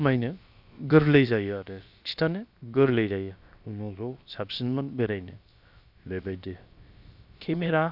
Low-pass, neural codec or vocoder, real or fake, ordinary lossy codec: 5.4 kHz; codec, 16 kHz, about 1 kbps, DyCAST, with the encoder's durations; fake; none